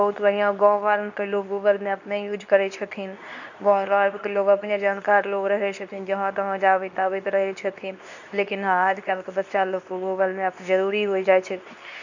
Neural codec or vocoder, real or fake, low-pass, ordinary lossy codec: codec, 24 kHz, 0.9 kbps, WavTokenizer, medium speech release version 2; fake; 7.2 kHz; none